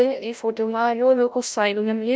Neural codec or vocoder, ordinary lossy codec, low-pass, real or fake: codec, 16 kHz, 0.5 kbps, FreqCodec, larger model; none; none; fake